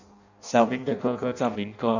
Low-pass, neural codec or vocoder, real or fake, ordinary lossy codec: 7.2 kHz; codec, 16 kHz in and 24 kHz out, 0.6 kbps, FireRedTTS-2 codec; fake; none